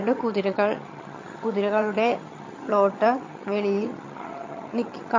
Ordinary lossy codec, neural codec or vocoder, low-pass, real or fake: MP3, 32 kbps; vocoder, 22.05 kHz, 80 mel bands, HiFi-GAN; 7.2 kHz; fake